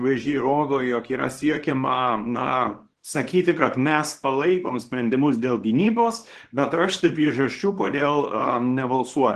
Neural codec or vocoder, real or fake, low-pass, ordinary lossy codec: codec, 24 kHz, 0.9 kbps, WavTokenizer, small release; fake; 10.8 kHz; Opus, 16 kbps